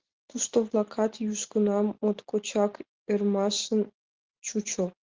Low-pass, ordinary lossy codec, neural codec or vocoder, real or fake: 7.2 kHz; Opus, 16 kbps; none; real